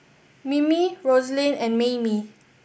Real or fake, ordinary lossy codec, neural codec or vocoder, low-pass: real; none; none; none